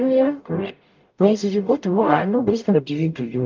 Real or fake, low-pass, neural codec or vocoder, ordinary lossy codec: fake; 7.2 kHz; codec, 44.1 kHz, 0.9 kbps, DAC; Opus, 24 kbps